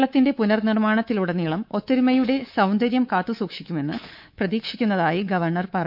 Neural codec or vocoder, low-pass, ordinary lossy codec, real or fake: codec, 24 kHz, 3.1 kbps, DualCodec; 5.4 kHz; none; fake